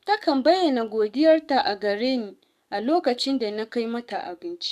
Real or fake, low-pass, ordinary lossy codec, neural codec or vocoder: fake; 14.4 kHz; none; codec, 44.1 kHz, 7.8 kbps, DAC